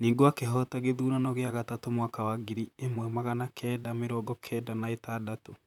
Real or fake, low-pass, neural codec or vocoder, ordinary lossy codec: fake; 19.8 kHz; vocoder, 44.1 kHz, 128 mel bands, Pupu-Vocoder; none